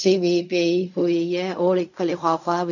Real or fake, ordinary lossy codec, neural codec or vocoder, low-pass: fake; none; codec, 16 kHz in and 24 kHz out, 0.4 kbps, LongCat-Audio-Codec, fine tuned four codebook decoder; 7.2 kHz